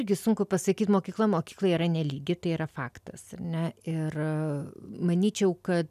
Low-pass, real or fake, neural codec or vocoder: 14.4 kHz; real; none